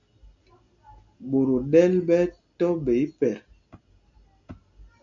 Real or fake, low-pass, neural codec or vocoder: real; 7.2 kHz; none